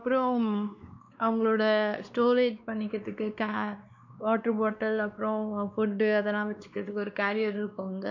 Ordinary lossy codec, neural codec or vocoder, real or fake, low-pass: MP3, 64 kbps; codec, 16 kHz, 2 kbps, X-Codec, WavLM features, trained on Multilingual LibriSpeech; fake; 7.2 kHz